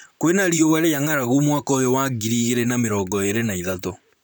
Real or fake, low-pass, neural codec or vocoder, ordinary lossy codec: fake; none; vocoder, 44.1 kHz, 128 mel bands, Pupu-Vocoder; none